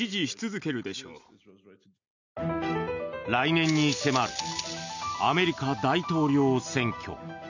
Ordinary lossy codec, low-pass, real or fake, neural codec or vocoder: none; 7.2 kHz; real; none